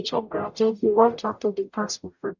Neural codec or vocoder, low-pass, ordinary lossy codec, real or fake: codec, 44.1 kHz, 0.9 kbps, DAC; 7.2 kHz; none; fake